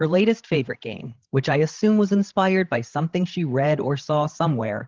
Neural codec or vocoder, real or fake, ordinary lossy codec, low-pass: codec, 16 kHz, 16 kbps, FreqCodec, larger model; fake; Opus, 16 kbps; 7.2 kHz